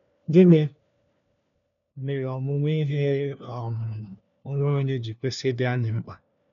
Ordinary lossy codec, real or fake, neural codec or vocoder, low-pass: none; fake; codec, 16 kHz, 1 kbps, FunCodec, trained on LibriTTS, 50 frames a second; 7.2 kHz